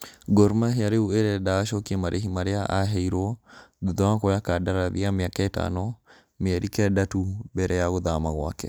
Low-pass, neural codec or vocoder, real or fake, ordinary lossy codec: none; none; real; none